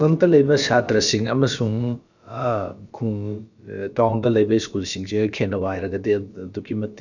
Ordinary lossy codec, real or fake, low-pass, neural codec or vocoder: none; fake; 7.2 kHz; codec, 16 kHz, about 1 kbps, DyCAST, with the encoder's durations